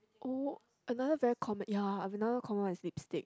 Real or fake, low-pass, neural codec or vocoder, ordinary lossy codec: real; none; none; none